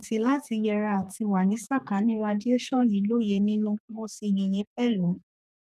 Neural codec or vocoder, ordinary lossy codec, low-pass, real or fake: codec, 32 kHz, 1.9 kbps, SNAC; none; 14.4 kHz; fake